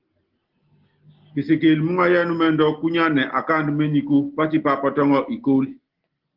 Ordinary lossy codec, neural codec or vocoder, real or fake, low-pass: Opus, 16 kbps; none; real; 5.4 kHz